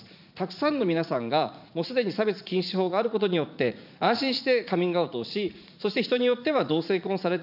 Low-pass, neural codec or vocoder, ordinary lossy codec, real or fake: 5.4 kHz; vocoder, 44.1 kHz, 80 mel bands, Vocos; none; fake